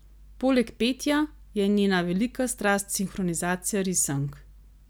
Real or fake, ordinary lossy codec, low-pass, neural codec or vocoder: real; none; none; none